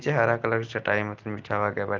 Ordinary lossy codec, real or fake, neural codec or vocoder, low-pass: Opus, 32 kbps; real; none; 7.2 kHz